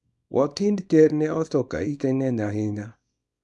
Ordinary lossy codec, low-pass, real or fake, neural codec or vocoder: none; none; fake; codec, 24 kHz, 0.9 kbps, WavTokenizer, small release